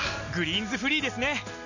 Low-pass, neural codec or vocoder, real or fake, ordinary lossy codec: 7.2 kHz; none; real; none